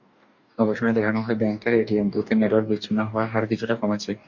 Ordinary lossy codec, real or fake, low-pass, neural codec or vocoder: MP3, 48 kbps; fake; 7.2 kHz; codec, 44.1 kHz, 2.6 kbps, DAC